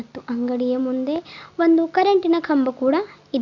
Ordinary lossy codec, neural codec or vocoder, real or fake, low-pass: MP3, 64 kbps; none; real; 7.2 kHz